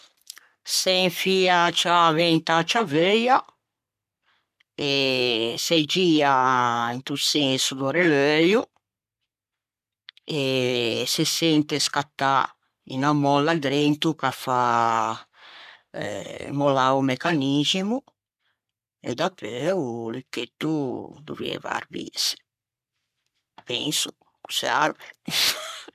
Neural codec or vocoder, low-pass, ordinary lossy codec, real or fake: codec, 44.1 kHz, 3.4 kbps, Pupu-Codec; 14.4 kHz; none; fake